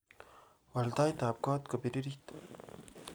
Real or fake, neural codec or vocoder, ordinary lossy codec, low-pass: real; none; none; none